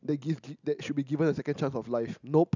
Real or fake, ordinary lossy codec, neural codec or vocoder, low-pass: real; none; none; 7.2 kHz